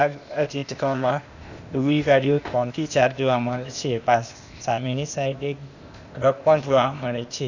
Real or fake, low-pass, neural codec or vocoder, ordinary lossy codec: fake; 7.2 kHz; codec, 16 kHz, 0.8 kbps, ZipCodec; none